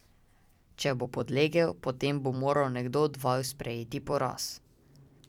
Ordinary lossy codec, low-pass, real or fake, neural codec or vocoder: none; 19.8 kHz; fake; vocoder, 44.1 kHz, 128 mel bands every 256 samples, BigVGAN v2